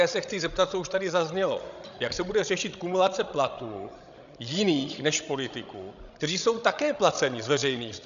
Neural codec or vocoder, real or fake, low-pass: codec, 16 kHz, 16 kbps, FreqCodec, larger model; fake; 7.2 kHz